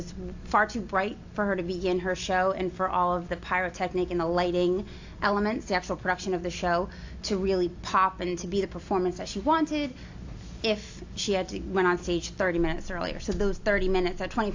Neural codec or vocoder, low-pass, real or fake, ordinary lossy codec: none; 7.2 kHz; real; AAC, 48 kbps